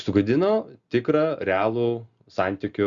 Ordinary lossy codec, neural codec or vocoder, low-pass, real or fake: Opus, 64 kbps; none; 7.2 kHz; real